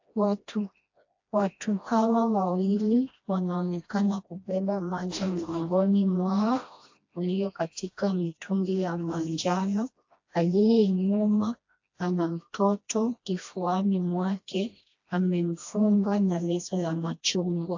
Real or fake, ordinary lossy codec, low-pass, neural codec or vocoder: fake; AAC, 48 kbps; 7.2 kHz; codec, 16 kHz, 1 kbps, FreqCodec, smaller model